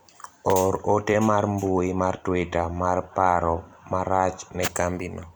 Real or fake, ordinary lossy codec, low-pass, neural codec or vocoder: fake; none; none; vocoder, 44.1 kHz, 128 mel bands every 256 samples, BigVGAN v2